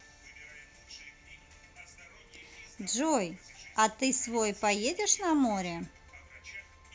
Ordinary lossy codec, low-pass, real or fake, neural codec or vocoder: none; none; real; none